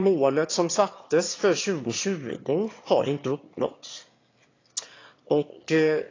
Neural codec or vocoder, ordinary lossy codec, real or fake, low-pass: autoencoder, 22.05 kHz, a latent of 192 numbers a frame, VITS, trained on one speaker; AAC, 32 kbps; fake; 7.2 kHz